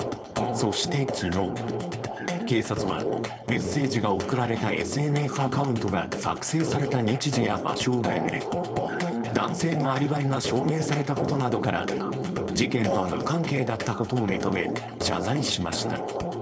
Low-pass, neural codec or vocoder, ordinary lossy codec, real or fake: none; codec, 16 kHz, 4.8 kbps, FACodec; none; fake